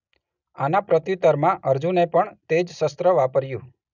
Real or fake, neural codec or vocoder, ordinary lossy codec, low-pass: real; none; none; 7.2 kHz